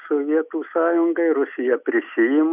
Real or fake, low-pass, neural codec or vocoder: real; 3.6 kHz; none